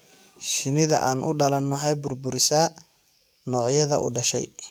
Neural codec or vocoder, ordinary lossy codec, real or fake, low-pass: codec, 44.1 kHz, 7.8 kbps, DAC; none; fake; none